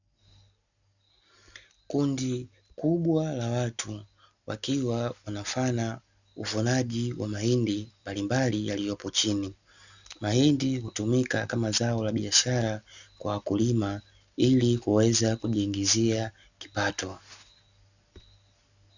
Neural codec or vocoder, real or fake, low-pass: none; real; 7.2 kHz